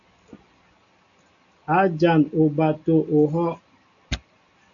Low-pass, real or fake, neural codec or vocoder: 7.2 kHz; real; none